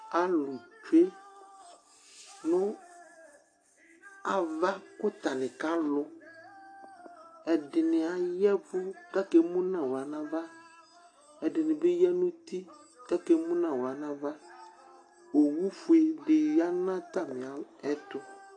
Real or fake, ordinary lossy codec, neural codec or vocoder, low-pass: real; AAC, 48 kbps; none; 9.9 kHz